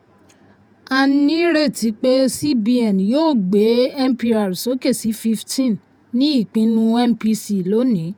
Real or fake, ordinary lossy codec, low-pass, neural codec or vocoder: fake; none; none; vocoder, 48 kHz, 128 mel bands, Vocos